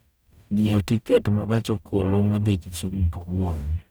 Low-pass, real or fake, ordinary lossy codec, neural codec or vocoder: none; fake; none; codec, 44.1 kHz, 0.9 kbps, DAC